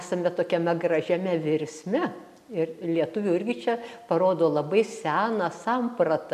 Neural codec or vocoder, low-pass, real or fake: none; 14.4 kHz; real